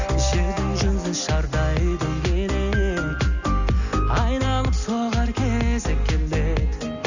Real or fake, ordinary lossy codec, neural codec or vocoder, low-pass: real; none; none; 7.2 kHz